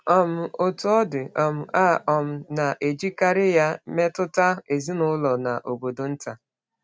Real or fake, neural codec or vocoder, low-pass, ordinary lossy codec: real; none; none; none